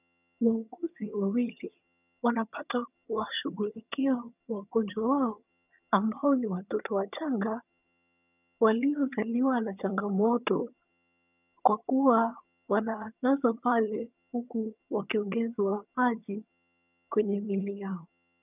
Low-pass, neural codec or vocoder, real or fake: 3.6 kHz; vocoder, 22.05 kHz, 80 mel bands, HiFi-GAN; fake